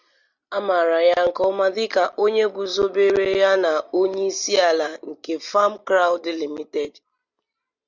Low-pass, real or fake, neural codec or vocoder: 7.2 kHz; real; none